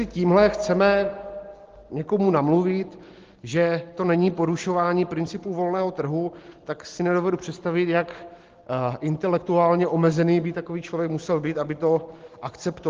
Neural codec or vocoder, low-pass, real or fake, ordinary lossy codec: none; 7.2 kHz; real; Opus, 16 kbps